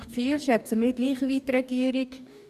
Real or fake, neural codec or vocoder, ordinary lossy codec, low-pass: fake; codec, 44.1 kHz, 2.6 kbps, DAC; none; 14.4 kHz